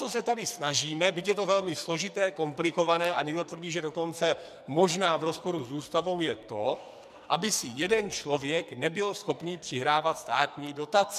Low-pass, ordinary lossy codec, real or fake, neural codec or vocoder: 14.4 kHz; MP3, 96 kbps; fake; codec, 44.1 kHz, 2.6 kbps, SNAC